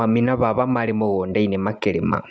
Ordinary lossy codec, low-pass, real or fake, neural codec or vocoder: none; none; real; none